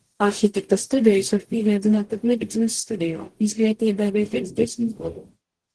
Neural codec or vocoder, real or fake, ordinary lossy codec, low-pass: codec, 44.1 kHz, 0.9 kbps, DAC; fake; Opus, 16 kbps; 10.8 kHz